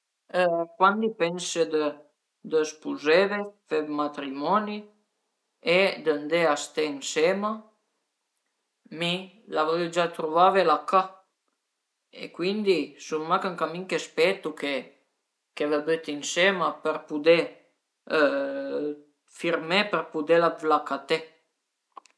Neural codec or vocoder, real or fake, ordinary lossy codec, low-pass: none; real; none; none